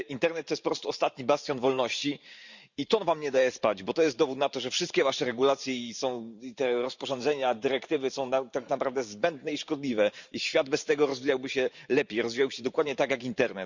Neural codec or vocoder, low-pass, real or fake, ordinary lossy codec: vocoder, 44.1 kHz, 128 mel bands every 512 samples, BigVGAN v2; 7.2 kHz; fake; Opus, 64 kbps